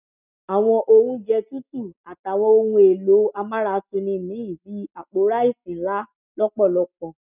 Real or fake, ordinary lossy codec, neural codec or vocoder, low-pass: real; none; none; 3.6 kHz